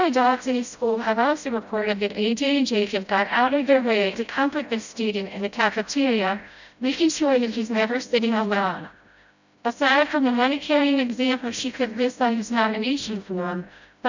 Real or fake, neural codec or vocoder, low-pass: fake; codec, 16 kHz, 0.5 kbps, FreqCodec, smaller model; 7.2 kHz